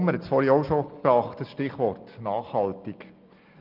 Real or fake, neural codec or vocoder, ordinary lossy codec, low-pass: real; none; Opus, 24 kbps; 5.4 kHz